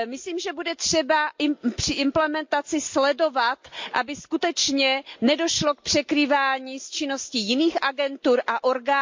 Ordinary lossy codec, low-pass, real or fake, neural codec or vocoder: MP3, 48 kbps; 7.2 kHz; real; none